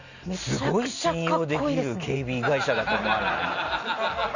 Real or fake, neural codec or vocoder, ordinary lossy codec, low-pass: real; none; Opus, 64 kbps; 7.2 kHz